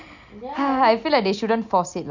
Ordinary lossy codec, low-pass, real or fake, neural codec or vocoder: none; 7.2 kHz; real; none